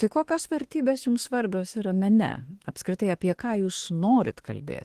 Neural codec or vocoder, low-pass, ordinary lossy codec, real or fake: autoencoder, 48 kHz, 32 numbers a frame, DAC-VAE, trained on Japanese speech; 14.4 kHz; Opus, 16 kbps; fake